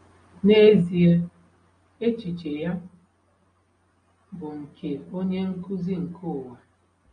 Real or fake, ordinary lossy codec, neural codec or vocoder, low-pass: real; AAC, 32 kbps; none; 9.9 kHz